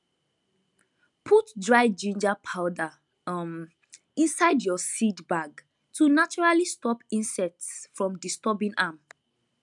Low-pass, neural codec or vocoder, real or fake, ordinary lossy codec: 10.8 kHz; none; real; none